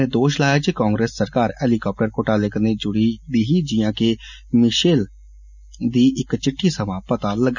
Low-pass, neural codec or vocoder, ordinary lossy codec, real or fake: 7.2 kHz; none; none; real